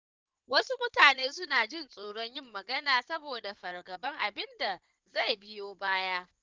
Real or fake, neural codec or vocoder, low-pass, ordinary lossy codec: fake; codec, 16 kHz in and 24 kHz out, 2.2 kbps, FireRedTTS-2 codec; 7.2 kHz; Opus, 32 kbps